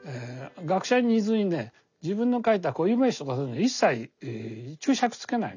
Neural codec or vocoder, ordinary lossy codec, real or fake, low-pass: none; none; real; 7.2 kHz